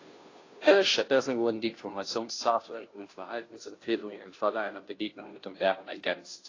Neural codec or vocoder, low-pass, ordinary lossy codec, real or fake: codec, 16 kHz, 0.5 kbps, FunCodec, trained on Chinese and English, 25 frames a second; 7.2 kHz; AAC, 32 kbps; fake